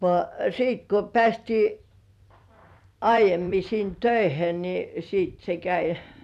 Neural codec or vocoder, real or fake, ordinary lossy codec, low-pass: vocoder, 44.1 kHz, 128 mel bands every 256 samples, BigVGAN v2; fake; none; 14.4 kHz